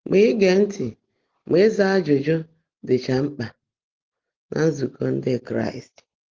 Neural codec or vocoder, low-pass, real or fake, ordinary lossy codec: none; 7.2 kHz; real; Opus, 16 kbps